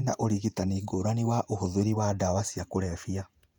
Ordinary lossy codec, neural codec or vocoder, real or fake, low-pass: none; vocoder, 48 kHz, 128 mel bands, Vocos; fake; 19.8 kHz